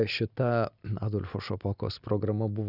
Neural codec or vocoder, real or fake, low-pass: none; real; 5.4 kHz